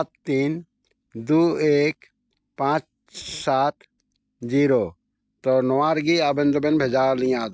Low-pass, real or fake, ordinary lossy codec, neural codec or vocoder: none; real; none; none